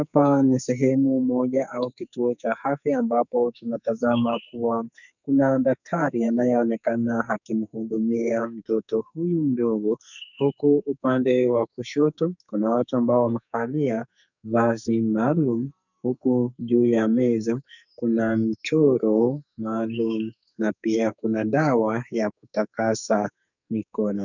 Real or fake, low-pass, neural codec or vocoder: fake; 7.2 kHz; codec, 44.1 kHz, 2.6 kbps, SNAC